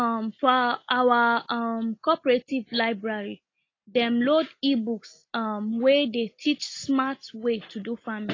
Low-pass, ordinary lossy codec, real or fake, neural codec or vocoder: 7.2 kHz; AAC, 32 kbps; real; none